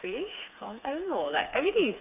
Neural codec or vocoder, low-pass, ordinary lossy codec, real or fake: codec, 16 kHz, 4 kbps, FreqCodec, smaller model; 3.6 kHz; none; fake